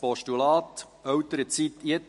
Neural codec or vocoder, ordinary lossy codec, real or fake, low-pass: none; MP3, 48 kbps; real; 14.4 kHz